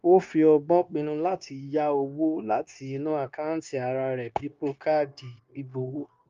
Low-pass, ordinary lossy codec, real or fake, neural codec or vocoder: 7.2 kHz; Opus, 32 kbps; fake; codec, 16 kHz, 0.9 kbps, LongCat-Audio-Codec